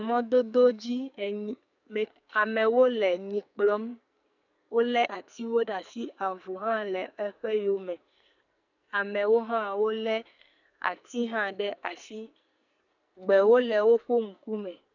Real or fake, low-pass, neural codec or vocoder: fake; 7.2 kHz; codec, 44.1 kHz, 2.6 kbps, SNAC